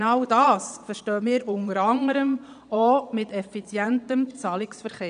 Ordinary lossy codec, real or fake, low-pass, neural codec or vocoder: none; fake; 9.9 kHz; vocoder, 22.05 kHz, 80 mel bands, Vocos